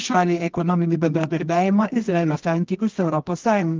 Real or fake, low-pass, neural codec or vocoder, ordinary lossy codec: fake; 7.2 kHz; codec, 24 kHz, 0.9 kbps, WavTokenizer, medium music audio release; Opus, 32 kbps